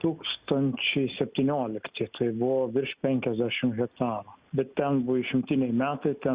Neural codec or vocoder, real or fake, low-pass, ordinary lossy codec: none; real; 3.6 kHz; Opus, 64 kbps